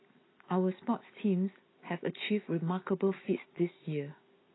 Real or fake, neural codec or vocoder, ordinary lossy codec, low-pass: real; none; AAC, 16 kbps; 7.2 kHz